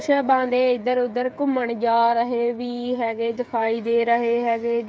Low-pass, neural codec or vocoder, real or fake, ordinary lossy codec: none; codec, 16 kHz, 8 kbps, FreqCodec, smaller model; fake; none